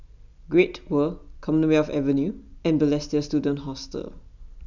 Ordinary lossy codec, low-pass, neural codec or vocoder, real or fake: none; 7.2 kHz; none; real